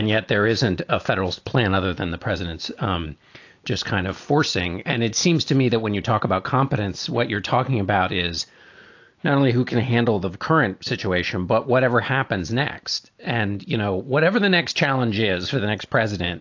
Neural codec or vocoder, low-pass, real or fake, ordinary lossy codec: none; 7.2 kHz; real; AAC, 48 kbps